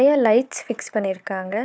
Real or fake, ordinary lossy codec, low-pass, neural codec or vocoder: fake; none; none; codec, 16 kHz, 4 kbps, FunCodec, trained on Chinese and English, 50 frames a second